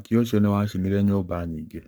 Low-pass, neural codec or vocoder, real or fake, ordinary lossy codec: none; codec, 44.1 kHz, 7.8 kbps, Pupu-Codec; fake; none